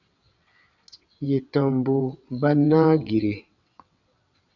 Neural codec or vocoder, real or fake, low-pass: vocoder, 22.05 kHz, 80 mel bands, WaveNeXt; fake; 7.2 kHz